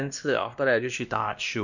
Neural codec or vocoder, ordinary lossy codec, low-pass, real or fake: codec, 16 kHz, 1 kbps, X-Codec, WavLM features, trained on Multilingual LibriSpeech; none; 7.2 kHz; fake